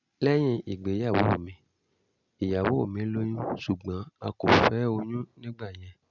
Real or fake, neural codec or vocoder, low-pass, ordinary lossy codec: real; none; 7.2 kHz; none